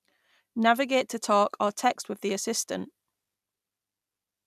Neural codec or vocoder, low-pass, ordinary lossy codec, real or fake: none; 14.4 kHz; none; real